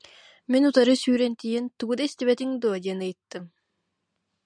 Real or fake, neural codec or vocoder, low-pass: real; none; 9.9 kHz